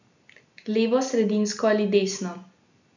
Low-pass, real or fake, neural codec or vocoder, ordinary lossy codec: 7.2 kHz; real; none; none